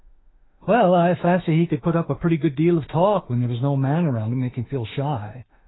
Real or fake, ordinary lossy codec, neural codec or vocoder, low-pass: fake; AAC, 16 kbps; autoencoder, 48 kHz, 32 numbers a frame, DAC-VAE, trained on Japanese speech; 7.2 kHz